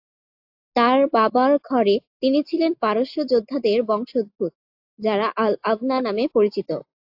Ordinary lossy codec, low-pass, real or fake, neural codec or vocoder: AAC, 48 kbps; 5.4 kHz; real; none